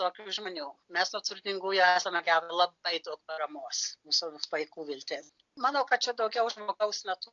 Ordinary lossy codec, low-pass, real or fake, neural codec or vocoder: AAC, 64 kbps; 7.2 kHz; real; none